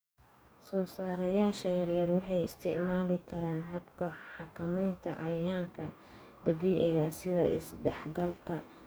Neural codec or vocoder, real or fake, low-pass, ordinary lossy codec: codec, 44.1 kHz, 2.6 kbps, DAC; fake; none; none